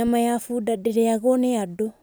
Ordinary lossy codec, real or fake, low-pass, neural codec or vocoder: none; real; none; none